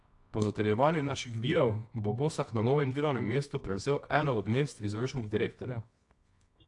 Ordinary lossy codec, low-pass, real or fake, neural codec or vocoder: AAC, 64 kbps; 10.8 kHz; fake; codec, 24 kHz, 0.9 kbps, WavTokenizer, medium music audio release